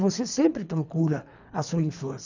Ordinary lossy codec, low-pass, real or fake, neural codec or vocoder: none; 7.2 kHz; fake; codec, 24 kHz, 3 kbps, HILCodec